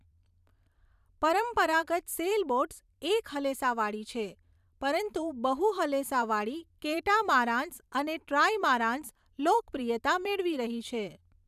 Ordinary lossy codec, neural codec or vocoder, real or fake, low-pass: none; vocoder, 44.1 kHz, 128 mel bands every 512 samples, BigVGAN v2; fake; 14.4 kHz